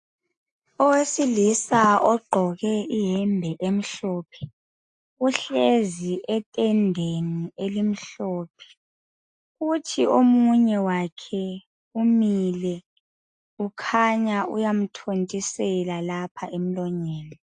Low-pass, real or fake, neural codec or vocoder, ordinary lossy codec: 9.9 kHz; real; none; AAC, 64 kbps